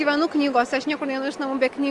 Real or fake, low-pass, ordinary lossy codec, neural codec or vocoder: real; 10.8 kHz; Opus, 24 kbps; none